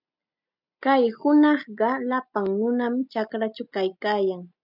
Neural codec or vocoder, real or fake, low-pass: none; real; 5.4 kHz